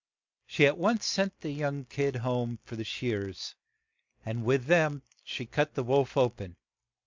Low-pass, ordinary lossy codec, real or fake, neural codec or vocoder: 7.2 kHz; MP3, 64 kbps; real; none